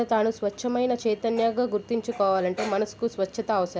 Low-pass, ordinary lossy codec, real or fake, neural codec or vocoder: none; none; real; none